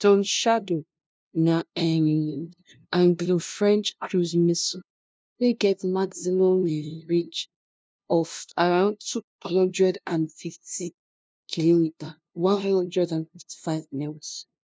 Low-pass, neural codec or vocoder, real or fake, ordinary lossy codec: none; codec, 16 kHz, 0.5 kbps, FunCodec, trained on LibriTTS, 25 frames a second; fake; none